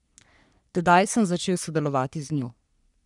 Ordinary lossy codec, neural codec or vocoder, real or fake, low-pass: none; codec, 44.1 kHz, 3.4 kbps, Pupu-Codec; fake; 10.8 kHz